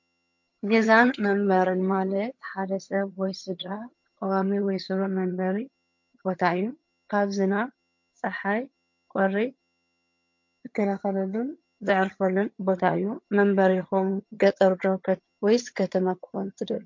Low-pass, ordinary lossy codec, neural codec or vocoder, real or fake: 7.2 kHz; MP3, 48 kbps; vocoder, 22.05 kHz, 80 mel bands, HiFi-GAN; fake